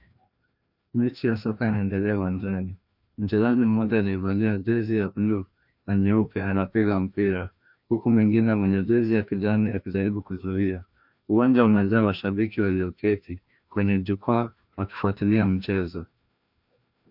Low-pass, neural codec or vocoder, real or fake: 5.4 kHz; codec, 16 kHz, 1 kbps, FreqCodec, larger model; fake